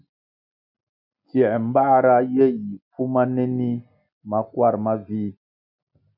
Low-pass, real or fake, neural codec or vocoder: 5.4 kHz; real; none